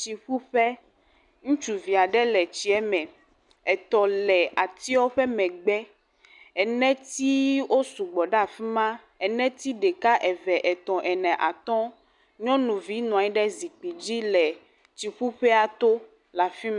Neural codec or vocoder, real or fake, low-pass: none; real; 10.8 kHz